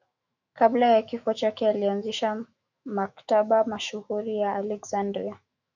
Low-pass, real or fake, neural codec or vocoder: 7.2 kHz; fake; autoencoder, 48 kHz, 128 numbers a frame, DAC-VAE, trained on Japanese speech